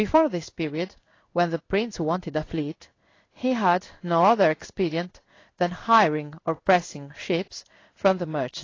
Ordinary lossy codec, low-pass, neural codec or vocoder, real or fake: AAC, 32 kbps; 7.2 kHz; codec, 16 kHz in and 24 kHz out, 1 kbps, XY-Tokenizer; fake